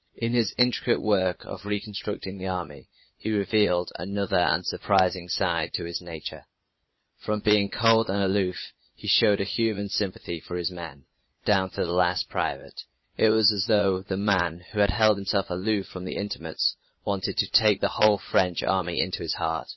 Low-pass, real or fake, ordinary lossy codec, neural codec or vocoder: 7.2 kHz; fake; MP3, 24 kbps; vocoder, 22.05 kHz, 80 mel bands, Vocos